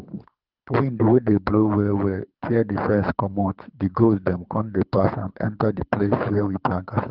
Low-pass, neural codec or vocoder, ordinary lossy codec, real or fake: 5.4 kHz; codec, 24 kHz, 3 kbps, HILCodec; Opus, 24 kbps; fake